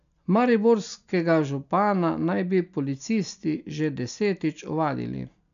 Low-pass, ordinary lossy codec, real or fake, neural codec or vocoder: 7.2 kHz; none; real; none